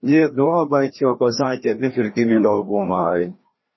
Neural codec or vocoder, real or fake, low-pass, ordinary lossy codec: codec, 16 kHz, 1 kbps, FreqCodec, larger model; fake; 7.2 kHz; MP3, 24 kbps